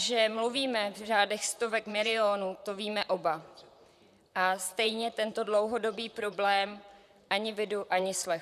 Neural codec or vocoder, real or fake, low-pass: vocoder, 44.1 kHz, 128 mel bands, Pupu-Vocoder; fake; 14.4 kHz